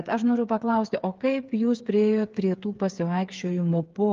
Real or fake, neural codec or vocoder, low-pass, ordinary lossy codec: fake; codec, 16 kHz, 8 kbps, FreqCodec, smaller model; 7.2 kHz; Opus, 32 kbps